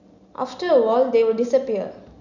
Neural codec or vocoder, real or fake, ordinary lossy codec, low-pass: none; real; none; 7.2 kHz